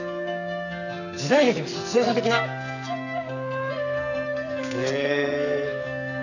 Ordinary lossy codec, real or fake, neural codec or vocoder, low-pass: none; fake; codec, 44.1 kHz, 2.6 kbps, SNAC; 7.2 kHz